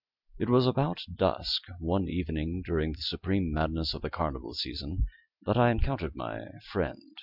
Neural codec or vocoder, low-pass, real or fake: none; 5.4 kHz; real